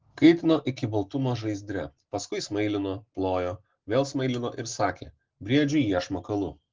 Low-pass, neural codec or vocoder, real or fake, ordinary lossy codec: 7.2 kHz; none; real; Opus, 16 kbps